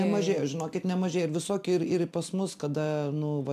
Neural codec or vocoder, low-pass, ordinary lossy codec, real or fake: none; 14.4 kHz; MP3, 96 kbps; real